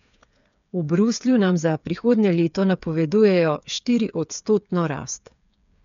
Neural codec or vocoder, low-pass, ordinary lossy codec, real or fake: codec, 16 kHz, 8 kbps, FreqCodec, smaller model; 7.2 kHz; none; fake